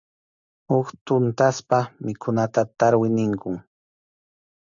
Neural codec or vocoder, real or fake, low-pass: none; real; 7.2 kHz